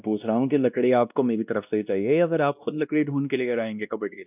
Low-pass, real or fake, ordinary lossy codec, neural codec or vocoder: 3.6 kHz; fake; none; codec, 16 kHz, 1 kbps, X-Codec, WavLM features, trained on Multilingual LibriSpeech